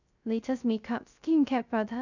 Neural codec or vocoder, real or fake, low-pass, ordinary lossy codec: codec, 16 kHz, 0.3 kbps, FocalCodec; fake; 7.2 kHz; none